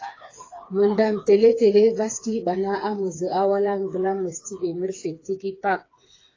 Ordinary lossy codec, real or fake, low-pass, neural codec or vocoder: AAC, 32 kbps; fake; 7.2 kHz; codec, 16 kHz, 4 kbps, FreqCodec, smaller model